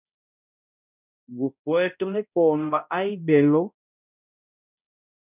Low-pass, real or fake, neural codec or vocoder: 3.6 kHz; fake; codec, 16 kHz, 0.5 kbps, X-Codec, HuBERT features, trained on balanced general audio